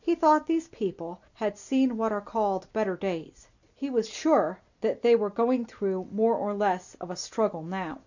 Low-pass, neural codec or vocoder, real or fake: 7.2 kHz; none; real